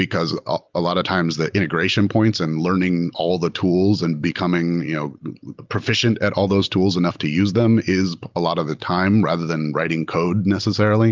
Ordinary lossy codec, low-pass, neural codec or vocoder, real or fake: Opus, 24 kbps; 7.2 kHz; none; real